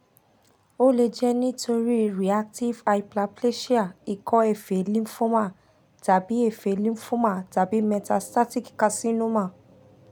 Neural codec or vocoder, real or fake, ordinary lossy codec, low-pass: none; real; none; none